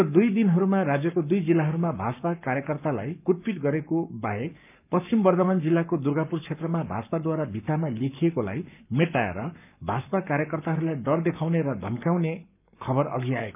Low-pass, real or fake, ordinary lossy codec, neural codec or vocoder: 3.6 kHz; fake; none; codec, 44.1 kHz, 7.8 kbps, Pupu-Codec